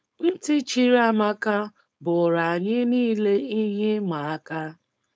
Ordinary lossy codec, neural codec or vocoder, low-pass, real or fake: none; codec, 16 kHz, 4.8 kbps, FACodec; none; fake